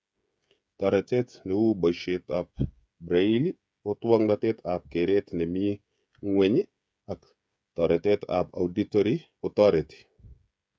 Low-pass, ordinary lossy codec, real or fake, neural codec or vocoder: none; none; fake; codec, 16 kHz, 16 kbps, FreqCodec, smaller model